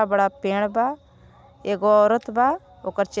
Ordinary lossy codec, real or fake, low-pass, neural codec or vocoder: none; real; none; none